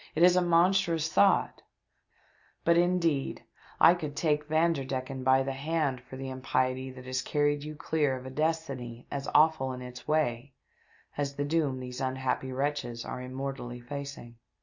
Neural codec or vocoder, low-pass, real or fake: none; 7.2 kHz; real